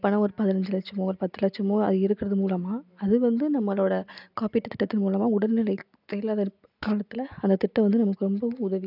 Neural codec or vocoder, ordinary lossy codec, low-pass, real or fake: none; none; 5.4 kHz; real